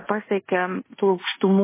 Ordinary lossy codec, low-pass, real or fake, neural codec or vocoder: MP3, 16 kbps; 3.6 kHz; fake; codec, 16 kHz in and 24 kHz out, 0.9 kbps, LongCat-Audio-Codec, fine tuned four codebook decoder